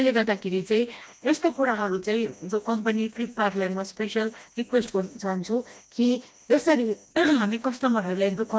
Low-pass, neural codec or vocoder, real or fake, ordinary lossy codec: none; codec, 16 kHz, 1 kbps, FreqCodec, smaller model; fake; none